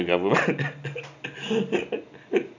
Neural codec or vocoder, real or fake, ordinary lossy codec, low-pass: none; real; none; 7.2 kHz